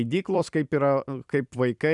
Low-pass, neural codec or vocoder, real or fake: 10.8 kHz; vocoder, 44.1 kHz, 128 mel bands every 256 samples, BigVGAN v2; fake